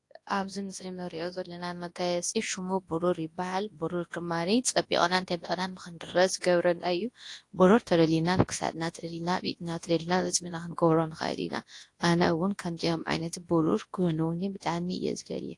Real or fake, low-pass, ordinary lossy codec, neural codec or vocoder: fake; 10.8 kHz; AAC, 48 kbps; codec, 24 kHz, 0.9 kbps, WavTokenizer, large speech release